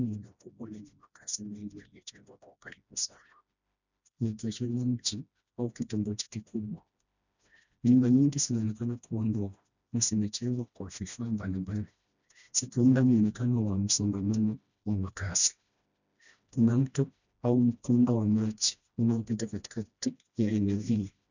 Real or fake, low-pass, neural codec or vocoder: fake; 7.2 kHz; codec, 16 kHz, 1 kbps, FreqCodec, smaller model